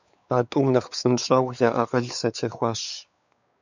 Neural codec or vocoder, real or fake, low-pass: codec, 16 kHz, 4 kbps, X-Codec, WavLM features, trained on Multilingual LibriSpeech; fake; 7.2 kHz